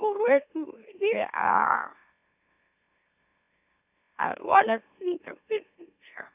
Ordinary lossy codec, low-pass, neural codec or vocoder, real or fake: none; 3.6 kHz; autoencoder, 44.1 kHz, a latent of 192 numbers a frame, MeloTTS; fake